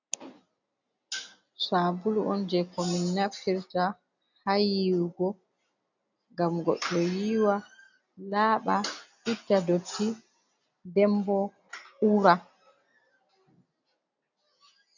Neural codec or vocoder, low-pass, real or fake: none; 7.2 kHz; real